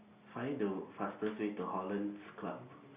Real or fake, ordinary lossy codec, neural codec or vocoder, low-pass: real; Opus, 64 kbps; none; 3.6 kHz